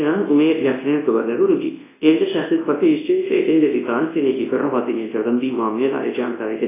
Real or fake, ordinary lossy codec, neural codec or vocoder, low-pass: fake; AAC, 16 kbps; codec, 24 kHz, 0.9 kbps, WavTokenizer, large speech release; 3.6 kHz